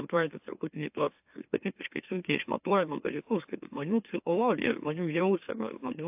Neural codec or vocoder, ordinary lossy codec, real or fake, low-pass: autoencoder, 44.1 kHz, a latent of 192 numbers a frame, MeloTTS; AAC, 32 kbps; fake; 3.6 kHz